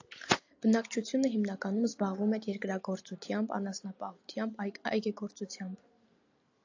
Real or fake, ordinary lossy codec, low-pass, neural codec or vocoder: real; AAC, 48 kbps; 7.2 kHz; none